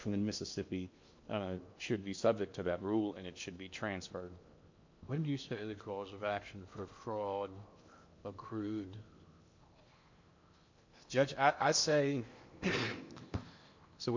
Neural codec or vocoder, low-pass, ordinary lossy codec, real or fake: codec, 16 kHz in and 24 kHz out, 0.8 kbps, FocalCodec, streaming, 65536 codes; 7.2 kHz; MP3, 48 kbps; fake